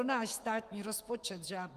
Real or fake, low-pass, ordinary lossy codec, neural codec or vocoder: fake; 14.4 kHz; Opus, 32 kbps; autoencoder, 48 kHz, 128 numbers a frame, DAC-VAE, trained on Japanese speech